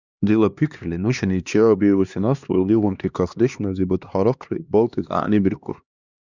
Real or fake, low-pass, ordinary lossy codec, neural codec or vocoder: fake; 7.2 kHz; Opus, 64 kbps; codec, 16 kHz, 2 kbps, X-Codec, HuBERT features, trained on LibriSpeech